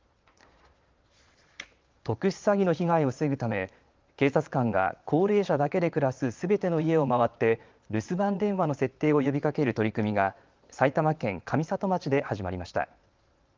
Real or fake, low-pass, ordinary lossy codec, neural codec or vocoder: fake; 7.2 kHz; Opus, 32 kbps; vocoder, 22.05 kHz, 80 mel bands, Vocos